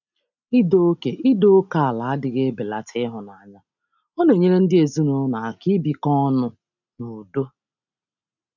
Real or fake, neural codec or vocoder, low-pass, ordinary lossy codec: real; none; 7.2 kHz; none